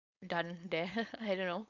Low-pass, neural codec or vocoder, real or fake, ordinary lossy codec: 7.2 kHz; codec, 16 kHz, 4.8 kbps, FACodec; fake; none